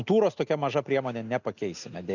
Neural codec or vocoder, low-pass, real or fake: none; 7.2 kHz; real